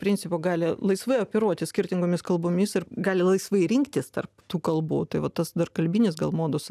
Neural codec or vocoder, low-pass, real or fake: none; 14.4 kHz; real